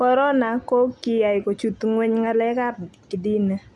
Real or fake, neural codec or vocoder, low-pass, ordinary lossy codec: real; none; none; none